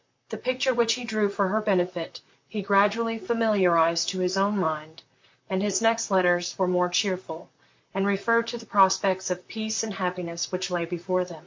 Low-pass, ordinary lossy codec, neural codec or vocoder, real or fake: 7.2 kHz; MP3, 48 kbps; vocoder, 44.1 kHz, 128 mel bands, Pupu-Vocoder; fake